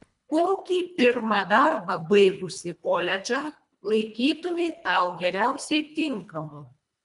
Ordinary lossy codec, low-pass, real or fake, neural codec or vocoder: MP3, 96 kbps; 10.8 kHz; fake; codec, 24 kHz, 1.5 kbps, HILCodec